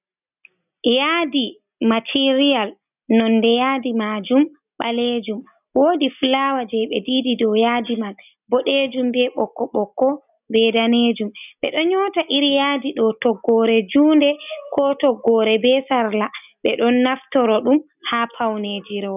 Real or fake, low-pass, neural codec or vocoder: real; 3.6 kHz; none